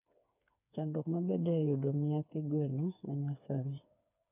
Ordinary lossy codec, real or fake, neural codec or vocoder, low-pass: none; fake; codec, 16 kHz, 4 kbps, FreqCodec, smaller model; 3.6 kHz